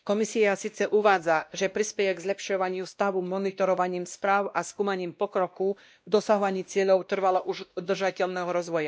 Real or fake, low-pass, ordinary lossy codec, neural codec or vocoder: fake; none; none; codec, 16 kHz, 1 kbps, X-Codec, WavLM features, trained on Multilingual LibriSpeech